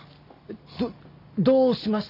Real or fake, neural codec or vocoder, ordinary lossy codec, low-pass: real; none; none; 5.4 kHz